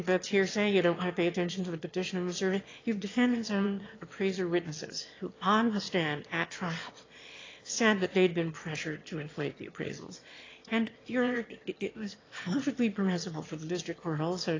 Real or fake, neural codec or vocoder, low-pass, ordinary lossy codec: fake; autoencoder, 22.05 kHz, a latent of 192 numbers a frame, VITS, trained on one speaker; 7.2 kHz; AAC, 32 kbps